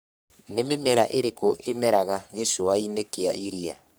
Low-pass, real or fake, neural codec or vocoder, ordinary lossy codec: none; fake; codec, 44.1 kHz, 3.4 kbps, Pupu-Codec; none